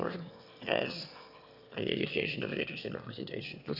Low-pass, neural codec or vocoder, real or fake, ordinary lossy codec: 5.4 kHz; autoencoder, 22.05 kHz, a latent of 192 numbers a frame, VITS, trained on one speaker; fake; Opus, 64 kbps